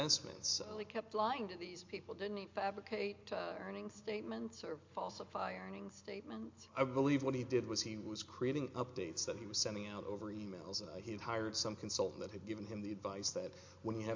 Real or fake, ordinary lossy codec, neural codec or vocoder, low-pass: real; MP3, 48 kbps; none; 7.2 kHz